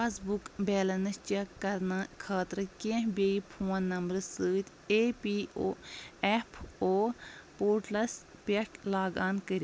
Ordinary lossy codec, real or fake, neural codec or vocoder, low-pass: none; real; none; none